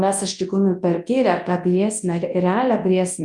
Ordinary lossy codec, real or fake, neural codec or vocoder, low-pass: Opus, 32 kbps; fake; codec, 24 kHz, 0.9 kbps, WavTokenizer, large speech release; 10.8 kHz